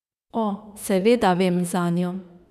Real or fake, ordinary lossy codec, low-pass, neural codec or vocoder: fake; none; 14.4 kHz; autoencoder, 48 kHz, 32 numbers a frame, DAC-VAE, trained on Japanese speech